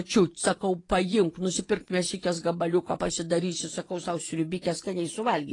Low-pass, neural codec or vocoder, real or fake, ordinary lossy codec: 10.8 kHz; vocoder, 24 kHz, 100 mel bands, Vocos; fake; AAC, 32 kbps